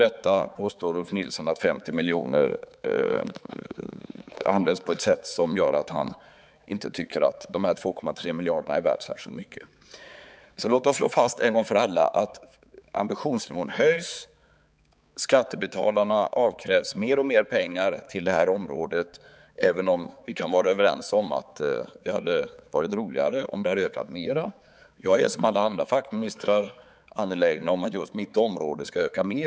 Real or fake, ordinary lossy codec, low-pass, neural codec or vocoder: fake; none; none; codec, 16 kHz, 4 kbps, X-Codec, HuBERT features, trained on balanced general audio